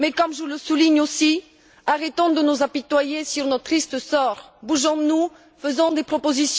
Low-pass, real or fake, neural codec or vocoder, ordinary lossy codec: none; real; none; none